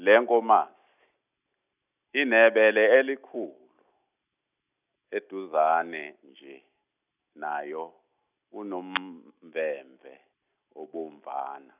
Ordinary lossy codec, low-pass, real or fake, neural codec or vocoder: none; 3.6 kHz; real; none